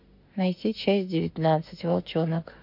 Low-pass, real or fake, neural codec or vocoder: 5.4 kHz; fake; autoencoder, 48 kHz, 32 numbers a frame, DAC-VAE, trained on Japanese speech